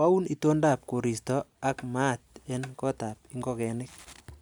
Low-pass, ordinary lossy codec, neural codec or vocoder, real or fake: none; none; none; real